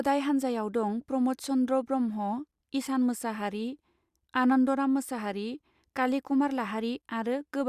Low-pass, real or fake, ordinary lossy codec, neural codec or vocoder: 14.4 kHz; real; Opus, 64 kbps; none